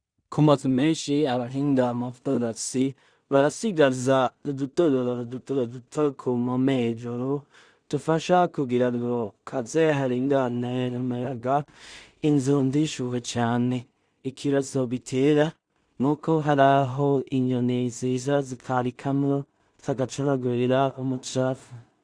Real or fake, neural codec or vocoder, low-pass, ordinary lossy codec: fake; codec, 16 kHz in and 24 kHz out, 0.4 kbps, LongCat-Audio-Codec, two codebook decoder; 9.9 kHz; Opus, 64 kbps